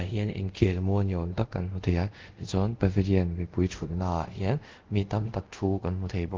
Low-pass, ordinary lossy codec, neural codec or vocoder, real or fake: 7.2 kHz; Opus, 16 kbps; codec, 24 kHz, 0.5 kbps, DualCodec; fake